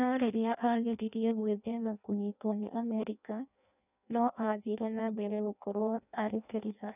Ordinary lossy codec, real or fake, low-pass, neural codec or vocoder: none; fake; 3.6 kHz; codec, 16 kHz in and 24 kHz out, 0.6 kbps, FireRedTTS-2 codec